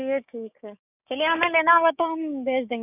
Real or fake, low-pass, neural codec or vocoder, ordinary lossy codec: fake; 3.6 kHz; codec, 16 kHz, 6 kbps, DAC; none